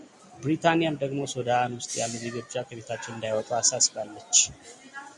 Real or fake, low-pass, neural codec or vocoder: real; 10.8 kHz; none